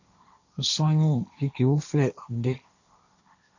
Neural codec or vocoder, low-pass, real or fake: codec, 16 kHz, 1.1 kbps, Voila-Tokenizer; 7.2 kHz; fake